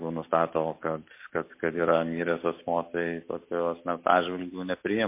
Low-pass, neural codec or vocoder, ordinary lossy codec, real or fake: 3.6 kHz; none; MP3, 24 kbps; real